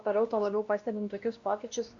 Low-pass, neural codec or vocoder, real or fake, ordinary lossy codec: 7.2 kHz; codec, 16 kHz, 1 kbps, X-Codec, WavLM features, trained on Multilingual LibriSpeech; fake; Opus, 64 kbps